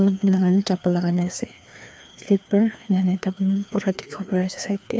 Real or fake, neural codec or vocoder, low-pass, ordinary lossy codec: fake; codec, 16 kHz, 2 kbps, FreqCodec, larger model; none; none